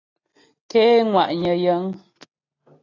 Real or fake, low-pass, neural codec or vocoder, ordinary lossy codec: real; 7.2 kHz; none; AAC, 32 kbps